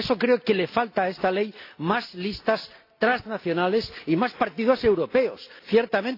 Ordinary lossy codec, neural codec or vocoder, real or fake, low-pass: AAC, 32 kbps; none; real; 5.4 kHz